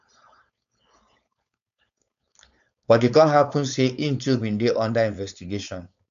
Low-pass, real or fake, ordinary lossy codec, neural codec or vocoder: 7.2 kHz; fake; none; codec, 16 kHz, 4.8 kbps, FACodec